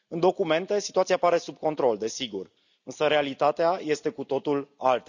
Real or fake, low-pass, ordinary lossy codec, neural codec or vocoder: real; 7.2 kHz; none; none